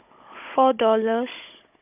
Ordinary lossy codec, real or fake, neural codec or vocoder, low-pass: none; fake; codec, 16 kHz, 16 kbps, FunCodec, trained on Chinese and English, 50 frames a second; 3.6 kHz